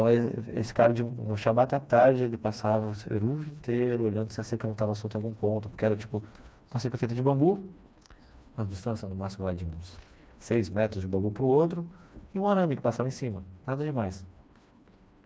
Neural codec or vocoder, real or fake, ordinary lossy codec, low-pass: codec, 16 kHz, 2 kbps, FreqCodec, smaller model; fake; none; none